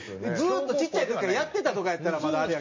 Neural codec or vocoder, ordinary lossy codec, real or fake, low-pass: none; MP3, 32 kbps; real; 7.2 kHz